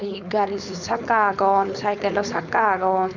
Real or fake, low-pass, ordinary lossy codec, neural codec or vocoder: fake; 7.2 kHz; none; codec, 16 kHz, 4.8 kbps, FACodec